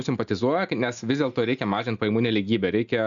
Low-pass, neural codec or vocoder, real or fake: 7.2 kHz; none; real